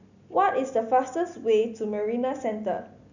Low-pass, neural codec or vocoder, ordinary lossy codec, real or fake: 7.2 kHz; none; none; real